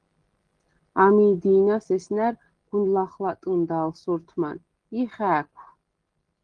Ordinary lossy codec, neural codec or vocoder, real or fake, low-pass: Opus, 16 kbps; none; real; 9.9 kHz